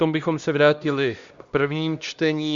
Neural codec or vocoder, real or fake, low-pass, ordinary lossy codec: codec, 16 kHz, 1 kbps, X-Codec, HuBERT features, trained on LibriSpeech; fake; 7.2 kHz; Opus, 64 kbps